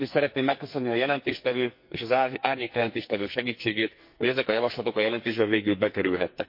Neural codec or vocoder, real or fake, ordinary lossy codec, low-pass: codec, 44.1 kHz, 2.6 kbps, SNAC; fake; MP3, 32 kbps; 5.4 kHz